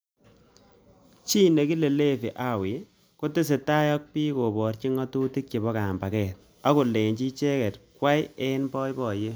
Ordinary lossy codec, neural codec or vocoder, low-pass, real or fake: none; none; none; real